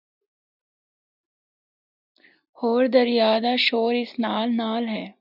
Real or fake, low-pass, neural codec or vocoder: real; 5.4 kHz; none